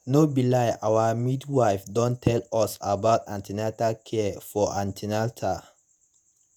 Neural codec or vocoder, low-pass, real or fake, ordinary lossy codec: vocoder, 48 kHz, 128 mel bands, Vocos; none; fake; none